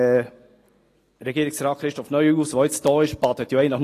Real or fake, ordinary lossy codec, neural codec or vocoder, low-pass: real; AAC, 48 kbps; none; 14.4 kHz